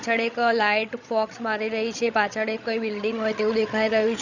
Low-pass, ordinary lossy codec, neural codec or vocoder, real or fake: 7.2 kHz; none; codec, 16 kHz, 16 kbps, FreqCodec, larger model; fake